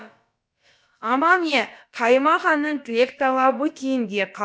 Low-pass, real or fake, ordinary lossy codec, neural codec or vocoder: none; fake; none; codec, 16 kHz, about 1 kbps, DyCAST, with the encoder's durations